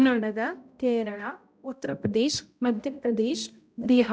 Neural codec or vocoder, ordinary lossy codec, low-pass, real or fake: codec, 16 kHz, 0.5 kbps, X-Codec, HuBERT features, trained on balanced general audio; none; none; fake